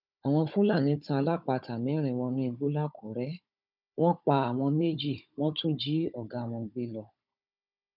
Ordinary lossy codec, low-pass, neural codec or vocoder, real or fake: none; 5.4 kHz; codec, 16 kHz, 16 kbps, FunCodec, trained on Chinese and English, 50 frames a second; fake